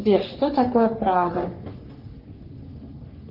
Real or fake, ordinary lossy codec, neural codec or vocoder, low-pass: fake; Opus, 24 kbps; codec, 44.1 kHz, 3.4 kbps, Pupu-Codec; 5.4 kHz